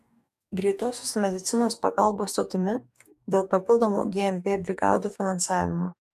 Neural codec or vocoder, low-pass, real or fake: codec, 44.1 kHz, 2.6 kbps, DAC; 14.4 kHz; fake